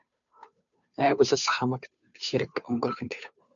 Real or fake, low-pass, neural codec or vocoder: fake; 7.2 kHz; codec, 16 kHz, 2 kbps, FunCodec, trained on Chinese and English, 25 frames a second